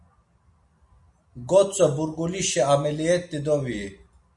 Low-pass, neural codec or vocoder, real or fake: 10.8 kHz; none; real